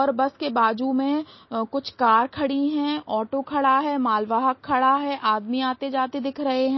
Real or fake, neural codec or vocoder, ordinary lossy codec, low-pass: real; none; MP3, 24 kbps; 7.2 kHz